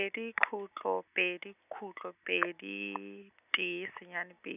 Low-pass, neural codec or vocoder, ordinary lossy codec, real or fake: 3.6 kHz; none; none; real